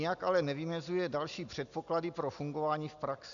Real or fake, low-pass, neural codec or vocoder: real; 7.2 kHz; none